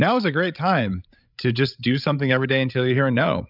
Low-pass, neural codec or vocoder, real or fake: 5.4 kHz; codec, 16 kHz, 16 kbps, FreqCodec, larger model; fake